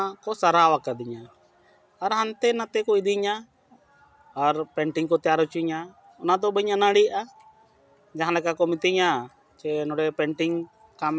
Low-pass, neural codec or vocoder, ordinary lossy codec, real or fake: none; none; none; real